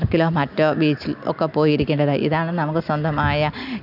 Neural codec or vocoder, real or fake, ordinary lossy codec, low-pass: none; real; none; 5.4 kHz